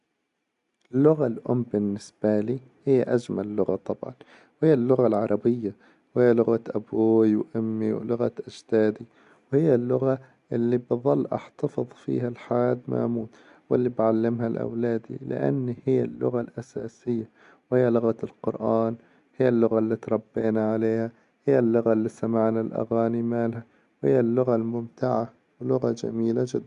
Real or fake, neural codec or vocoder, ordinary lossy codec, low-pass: real; none; MP3, 64 kbps; 10.8 kHz